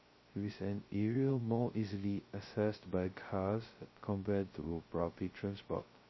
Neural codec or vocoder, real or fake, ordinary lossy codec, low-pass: codec, 16 kHz, 0.2 kbps, FocalCodec; fake; MP3, 24 kbps; 7.2 kHz